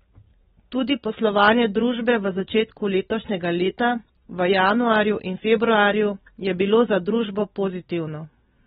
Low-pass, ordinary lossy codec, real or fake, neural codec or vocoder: 19.8 kHz; AAC, 16 kbps; real; none